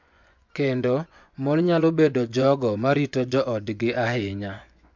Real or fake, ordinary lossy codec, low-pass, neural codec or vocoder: fake; MP3, 64 kbps; 7.2 kHz; vocoder, 24 kHz, 100 mel bands, Vocos